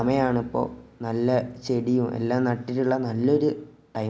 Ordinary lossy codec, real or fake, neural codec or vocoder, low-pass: none; real; none; none